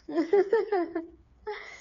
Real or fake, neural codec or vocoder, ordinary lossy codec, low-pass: fake; codec, 16 kHz, 4 kbps, FreqCodec, smaller model; none; 7.2 kHz